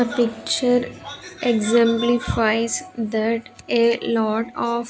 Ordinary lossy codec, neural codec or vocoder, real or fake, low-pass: none; none; real; none